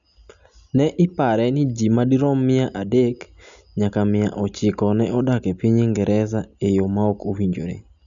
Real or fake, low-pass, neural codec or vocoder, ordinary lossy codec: real; 7.2 kHz; none; none